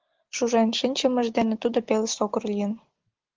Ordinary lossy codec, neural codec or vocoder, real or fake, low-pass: Opus, 16 kbps; none; real; 7.2 kHz